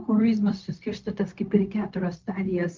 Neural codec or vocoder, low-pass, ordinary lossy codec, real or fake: codec, 16 kHz, 0.4 kbps, LongCat-Audio-Codec; 7.2 kHz; Opus, 24 kbps; fake